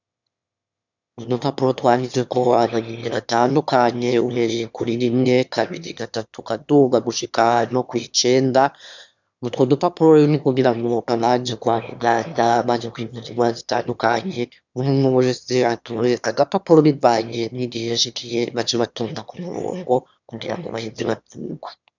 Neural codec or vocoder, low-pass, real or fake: autoencoder, 22.05 kHz, a latent of 192 numbers a frame, VITS, trained on one speaker; 7.2 kHz; fake